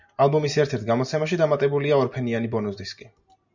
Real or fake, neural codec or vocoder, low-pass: real; none; 7.2 kHz